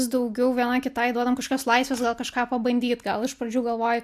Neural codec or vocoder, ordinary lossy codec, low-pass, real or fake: none; AAC, 96 kbps; 14.4 kHz; real